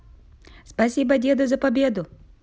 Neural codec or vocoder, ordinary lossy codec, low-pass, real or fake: none; none; none; real